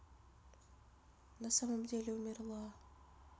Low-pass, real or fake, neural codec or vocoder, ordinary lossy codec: none; real; none; none